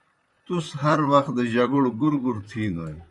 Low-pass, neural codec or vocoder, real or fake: 10.8 kHz; vocoder, 44.1 kHz, 128 mel bands, Pupu-Vocoder; fake